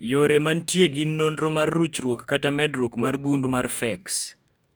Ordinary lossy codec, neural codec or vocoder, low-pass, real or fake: none; codec, 44.1 kHz, 2.6 kbps, DAC; none; fake